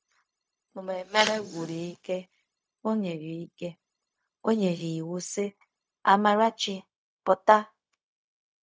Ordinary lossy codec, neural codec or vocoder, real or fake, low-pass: none; codec, 16 kHz, 0.4 kbps, LongCat-Audio-Codec; fake; none